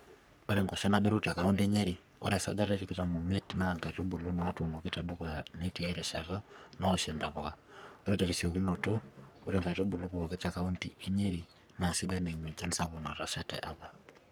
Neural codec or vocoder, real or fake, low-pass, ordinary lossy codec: codec, 44.1 kHz, 3.4 kbps, Pupu-Codec; fake; none; none